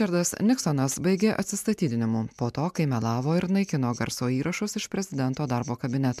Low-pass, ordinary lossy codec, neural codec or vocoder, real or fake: 14.4 kHz; MP3, 96 kbps; none; real